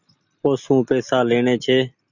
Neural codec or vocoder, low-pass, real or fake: none; 7.2 kHz; real